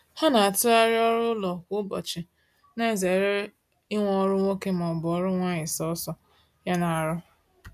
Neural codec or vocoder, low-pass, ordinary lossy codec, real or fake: none; 14.4 kHz; none; real